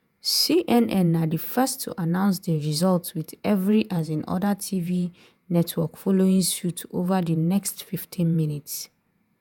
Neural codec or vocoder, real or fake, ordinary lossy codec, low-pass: vocoder, 48 kHz, 128 mel bands, Vocos; fake; none; none